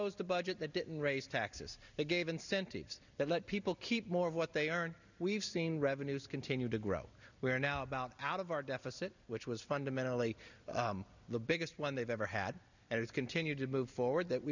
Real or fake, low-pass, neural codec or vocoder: real; 7.2 kHz; none